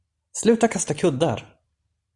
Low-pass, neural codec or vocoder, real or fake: 10.8 kHz; vocoder, 44.1 kHz, 128 mel bands every 512 samples, BigVGAN v2; fake